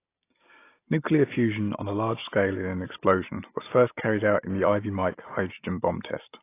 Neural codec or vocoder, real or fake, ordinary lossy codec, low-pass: none; real; AAC, 24 kbps; 3.6 kHz